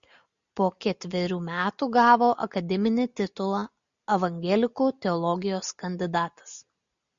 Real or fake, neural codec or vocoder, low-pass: real; none; 7.2 kHz